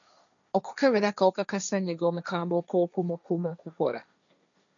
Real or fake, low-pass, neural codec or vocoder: fake; 7.2 kHz; codec, 16 kHz, 1.1 kbps, Voila-Tokenizer